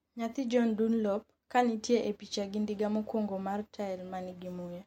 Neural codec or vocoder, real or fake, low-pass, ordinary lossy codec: none; real; 19.8 kHz; MP3, 64 kbps